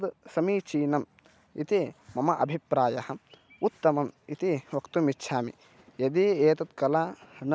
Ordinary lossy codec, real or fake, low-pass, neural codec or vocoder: none; real; none; none